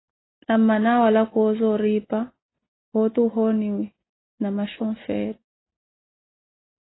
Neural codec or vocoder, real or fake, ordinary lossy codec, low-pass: none; real; AAC, 16 kbps; 7.2 kHz